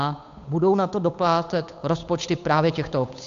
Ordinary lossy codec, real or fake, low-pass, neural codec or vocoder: AAC, 64 kbps; fake; 7.2 kHz; codec, 16 kHz, 8 kbps, FunCodec, trained on Chinese and English, 25 frames a second